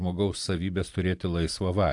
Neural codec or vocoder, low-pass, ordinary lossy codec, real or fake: none; 10.8 kHz; AAC, 64 kbps; real